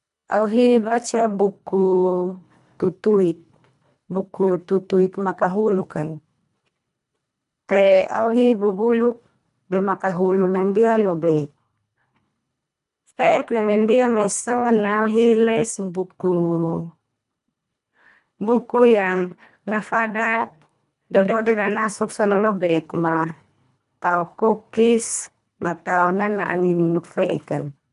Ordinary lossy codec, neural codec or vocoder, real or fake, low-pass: none; codec, 24 kHz, 1.5 kbps, HILCodec; fake; 10.8 kHz